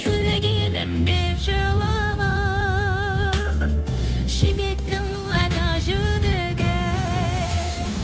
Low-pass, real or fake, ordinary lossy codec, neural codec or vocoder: none; fake; none; codec, 16 kHz, 0.9 kbps, LongCat-Audio-Codec